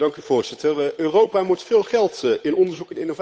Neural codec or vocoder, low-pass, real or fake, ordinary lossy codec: codec, 16 kHz, 8 kbps, FunCodec, trained on Chinese and English, 25 frames a second; none; fake; none